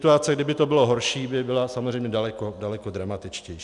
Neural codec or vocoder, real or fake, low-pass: none; real; 10.8 kHz